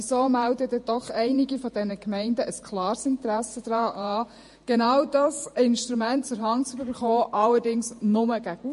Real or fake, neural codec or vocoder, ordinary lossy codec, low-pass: fake; vocoder, 48 kHz, 128 mel bands, Vocos; MP3, 48 kbps; 14.4 kHz